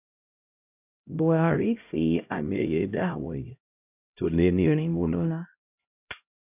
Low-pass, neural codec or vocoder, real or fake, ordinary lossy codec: 3.6 kHz; codec, 16 kHz, 0.5 kbps, X-Codec, HuBERT features, trained on LibriSpeech; fake; none